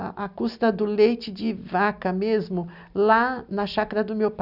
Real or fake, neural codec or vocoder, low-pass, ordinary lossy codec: real; none; 5.4 kHz; none